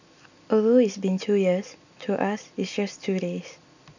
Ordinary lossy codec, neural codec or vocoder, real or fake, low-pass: none; none; real; 7.2 kHz